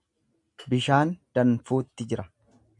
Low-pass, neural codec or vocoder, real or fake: 10.8 kHz; none; real